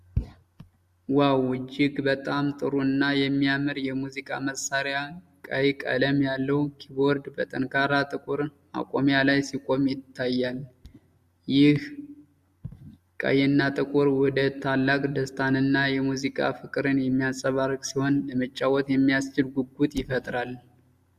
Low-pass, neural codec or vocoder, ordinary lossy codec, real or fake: 14.4 kHz; none; Opus, 64 kbps; real